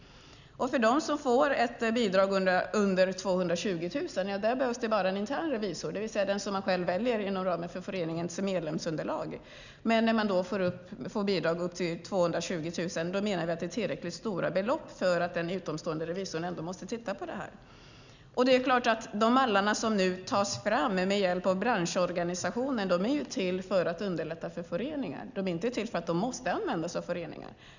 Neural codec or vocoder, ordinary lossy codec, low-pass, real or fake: none; none; 7.2 kHz; real